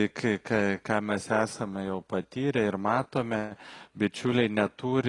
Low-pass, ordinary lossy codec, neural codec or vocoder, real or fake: 10.8 kHz; AAC, 32 kbps; none; real